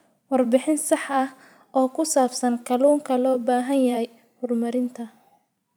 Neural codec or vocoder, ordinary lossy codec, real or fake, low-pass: vocoder, 44.1 kHz, 128 mel bands every 512 samples, BigVGAN v2; none; fake; none